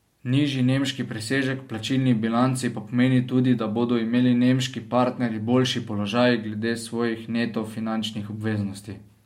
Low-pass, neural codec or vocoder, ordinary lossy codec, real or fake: 19.8 kHz; none; MP3, 64 kbps; real